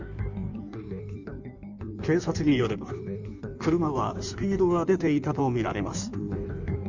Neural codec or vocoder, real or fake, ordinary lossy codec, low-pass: codec, 16 kHz in and 24 kHz out, 1.1 kbps, FireRedTTS-2 codec; fake; none; 7.2 kHz